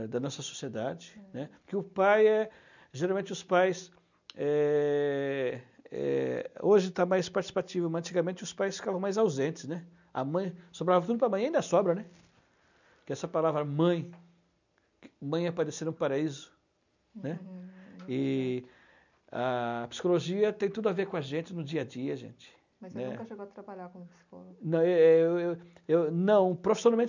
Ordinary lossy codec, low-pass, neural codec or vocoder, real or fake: none; 7.2 kHz; none; real